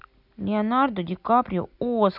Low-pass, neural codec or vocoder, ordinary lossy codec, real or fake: 5.4 kHz; none; none; real